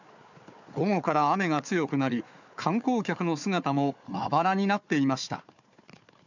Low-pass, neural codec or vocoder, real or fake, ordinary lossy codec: 7.2 kHz; codec, 16 kHz, 4 kbps, FunCodec, trained on Chinese and English, 50 frames a second; fake; none